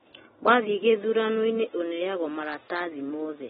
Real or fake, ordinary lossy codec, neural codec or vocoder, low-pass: real; AAC, 16 kbps; none; 7.2 kHz